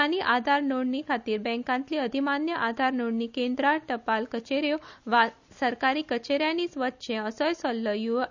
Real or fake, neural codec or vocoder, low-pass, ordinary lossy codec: real; none; 7.2 kHz; none